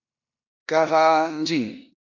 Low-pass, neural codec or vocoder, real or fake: 7.2 kHz; codec, 16 kHz in and 24 kHz out, 0.9 kbps, LongCat-Audio-Codec, four codebook decoder; fake